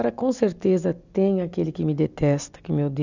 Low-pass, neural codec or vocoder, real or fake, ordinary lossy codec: 7.2 kHz; none; real; none